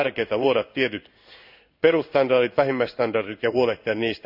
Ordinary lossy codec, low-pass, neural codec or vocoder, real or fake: none; 5.4 kHz; codec, 16 kHz in and 24 kHz out, 1 kbps, XY-Tokenizer; fake